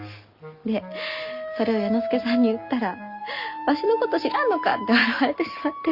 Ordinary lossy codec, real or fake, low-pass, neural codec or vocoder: Opus, 64 kbps; real; 5.4 kHz; none